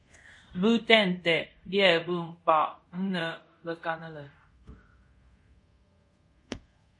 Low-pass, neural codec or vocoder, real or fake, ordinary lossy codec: 10.8 kHz; codec, 24 kHz, 0.5 kbps, DualCodec; fake; MP3, 48 kbps